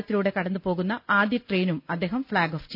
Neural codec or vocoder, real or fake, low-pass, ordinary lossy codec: none; real; 5.4 kHz; none